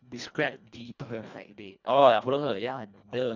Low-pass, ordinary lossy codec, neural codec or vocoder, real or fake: 7.2 kHz; none; codec, 24 kHz, 1.5 kbps, HILCodec; fake